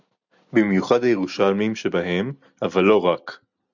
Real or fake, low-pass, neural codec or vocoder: real; 7.2 kHz; none